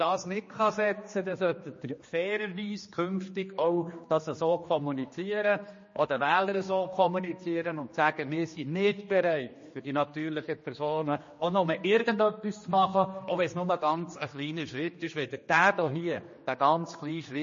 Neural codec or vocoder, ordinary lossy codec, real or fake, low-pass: codec, 16 kHz, 2 kbps, X-Codec, HuBERT features, trained on general audio; MP3, 32 kbps; fake; 7.2 kHz